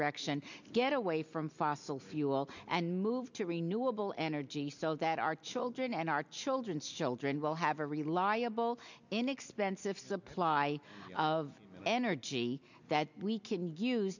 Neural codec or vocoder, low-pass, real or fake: none; 7.2 kHz; real